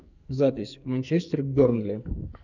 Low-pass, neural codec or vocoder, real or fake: 7.2 kHz; codec, 44.1 kHz, 2.6 kbps, SNAC; fake